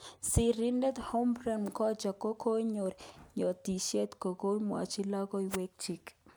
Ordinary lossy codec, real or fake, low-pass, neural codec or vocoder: none; real; none; none